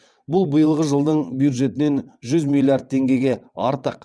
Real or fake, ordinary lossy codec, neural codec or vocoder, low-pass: fake; none; vocoder, 22.05 kHz, 80 mel bands, WaveNeXt; none